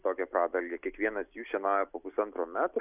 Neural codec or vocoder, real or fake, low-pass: none; real; 3.6 kHz